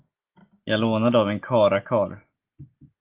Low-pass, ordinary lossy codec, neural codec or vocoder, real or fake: 3.6 kHz; Opus, 16 kbps; none; real